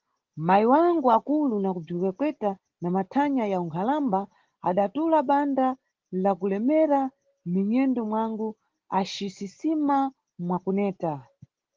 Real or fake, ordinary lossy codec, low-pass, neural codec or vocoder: real; Opus, 16 kbps; 7.2 kHz; none